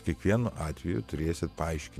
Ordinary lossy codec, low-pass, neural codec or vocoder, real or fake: MP3, 96 kbps; 14.4 kHz; vocoder, 48 kHz, 128 mel bands, Vocos; fake